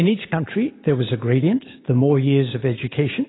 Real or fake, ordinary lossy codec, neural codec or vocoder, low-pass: real; AAC, 16 kbps; none; 7.2 kHz